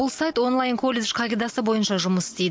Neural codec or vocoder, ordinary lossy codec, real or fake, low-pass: none; none; real; none